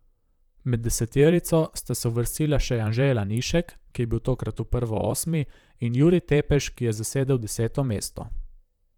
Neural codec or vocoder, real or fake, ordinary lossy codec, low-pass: vocoder, 44.1 kHz, 128 mel bands, Pupu-Vocoder; fake; none; 19.8 kHz